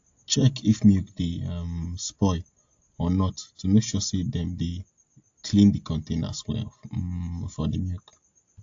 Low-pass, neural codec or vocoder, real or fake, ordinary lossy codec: 7.2 kHz; none; real; AAC, 48 kbps